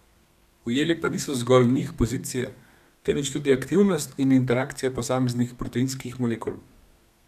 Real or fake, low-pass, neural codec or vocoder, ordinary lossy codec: fake; 14.4 kHz; codec, 32 kHz, 1.9 kbps, SNAC; none